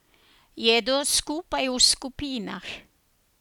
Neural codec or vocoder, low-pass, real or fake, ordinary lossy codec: none; 19.8 kHz; real; none